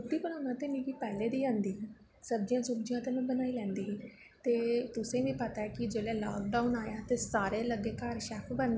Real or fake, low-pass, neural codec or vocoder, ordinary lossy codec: real; none; none; none